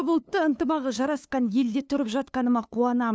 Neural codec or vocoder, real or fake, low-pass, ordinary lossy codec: codec, 16 kHz, 2 kbps, FunCodec, trained on LibriTTS, 25 frames a second; fake; none; none